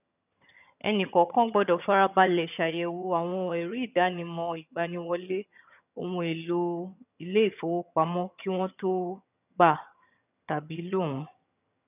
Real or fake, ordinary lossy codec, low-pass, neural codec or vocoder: fake; none; 3.6 kHz; vocoder, 22.05 kHz, 80 mel bands, HiFi-GAN